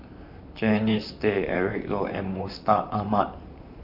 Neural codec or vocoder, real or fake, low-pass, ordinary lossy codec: vocoder, 44.1 kHz, 128 mel bands, Pupu-Vocoder; fake; 5.4 kHz; none